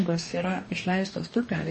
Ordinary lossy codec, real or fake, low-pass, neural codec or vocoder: MP3, 32 kbps; fake; 10.8 kHz; codec, 44.1 kHz, 2.6 kbps, DAC